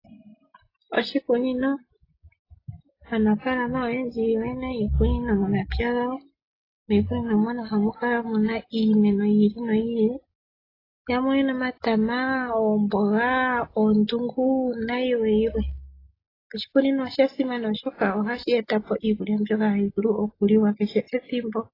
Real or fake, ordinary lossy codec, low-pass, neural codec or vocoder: real; AAC, 24 kbps; 5.4 kHz; none